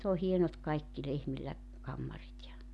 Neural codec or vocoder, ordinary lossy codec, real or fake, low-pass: none; none; real; none